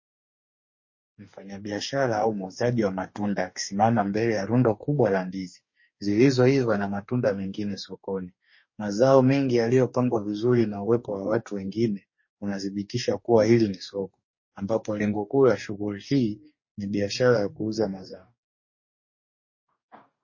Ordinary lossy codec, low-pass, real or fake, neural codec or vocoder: MP3, 32 kbps; 7.2 kHz; fake; codec, 44.1 kHz, 2.6 kbps, DAC